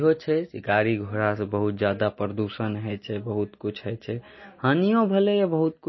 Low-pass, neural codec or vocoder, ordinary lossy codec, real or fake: 7.2 kHz; none; MP3, 24 kbps; real